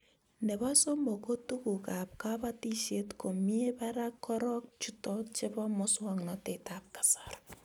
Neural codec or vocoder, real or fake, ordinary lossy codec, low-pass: none; real; none; none